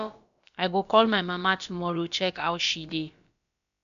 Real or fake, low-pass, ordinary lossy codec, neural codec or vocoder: fake; 7.2 kHz; none; codec, 16 kHz, about 1 kbps, DyCAST, with the encoder's durations